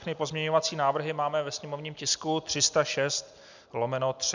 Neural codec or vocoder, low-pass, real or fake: none; 7.2 kHz; real